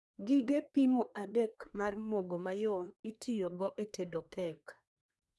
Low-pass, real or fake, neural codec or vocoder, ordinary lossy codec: none; fake; codec, 24 kHz, 1 kbps, SNAC; none